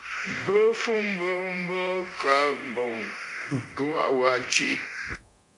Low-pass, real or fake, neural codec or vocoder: 10.8 kHz; fake; codec, 24 kHz, 0.9 kbps, DualCodec